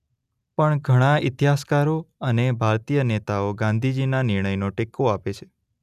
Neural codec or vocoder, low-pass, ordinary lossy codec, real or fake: none; 14.4 kHz; none; real